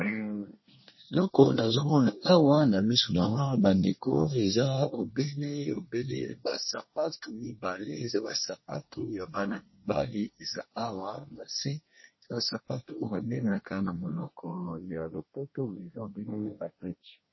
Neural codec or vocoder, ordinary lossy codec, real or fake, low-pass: codec, 24 kHz, 1 kbps, SNAC; MP3, 24 kbps; fake; 7.2 kHz